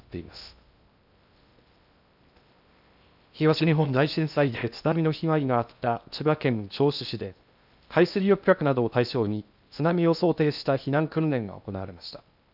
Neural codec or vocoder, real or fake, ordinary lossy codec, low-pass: codec, 16 kHz in and 24 kHz out, 0.6 kbps, FocalCodec, streaming, 2048 codes; fake; none; 5.4 kHz